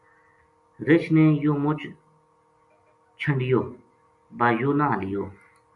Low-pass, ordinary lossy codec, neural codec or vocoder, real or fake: 10.8 kHz; MP3, 96 kbps; none; real